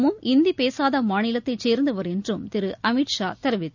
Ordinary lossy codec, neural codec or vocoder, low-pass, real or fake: none; none; 7.2 kHz; real